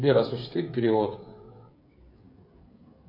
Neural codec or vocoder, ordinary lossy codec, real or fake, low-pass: codec, 16 kHz, 16 kbps, FreqCodec, smaller model; MP3, 32 kbps; fake; 5.4 kHz